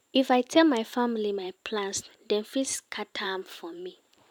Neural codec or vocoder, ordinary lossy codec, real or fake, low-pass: vocoder, 44.1 kHz, 128 mel bands every 512 samples, BigVGAN v2; none; fake; 19.8 kHz